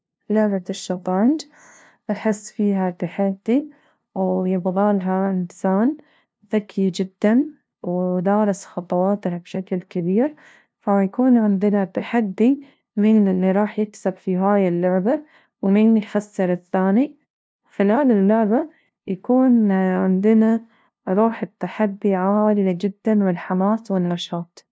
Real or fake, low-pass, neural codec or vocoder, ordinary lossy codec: fake; none; codec, 16 kHz, 0.5 kbps, FunCodec, trained on LibriTTS, 25 frames a second; none